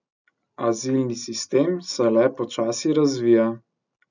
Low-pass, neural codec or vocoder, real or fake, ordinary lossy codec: 7.2 kHz; none; real; none